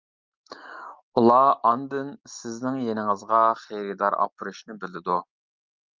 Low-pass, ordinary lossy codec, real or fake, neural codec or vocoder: 7.2 kHz; Opus, 24 kbps; real; none